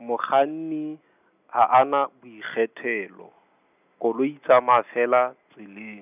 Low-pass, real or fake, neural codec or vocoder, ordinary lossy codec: 3.6 kHz; real; none; none